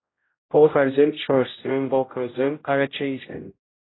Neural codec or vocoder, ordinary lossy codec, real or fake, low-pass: codec, 16 kHz, 0.5 kbps, X-Codec, HuBERT features, trained on general audio; AAC, 16 kbps; fake; 7.2 kHz